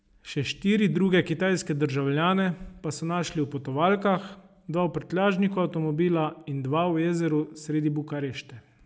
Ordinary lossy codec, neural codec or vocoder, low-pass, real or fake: none; none; none; real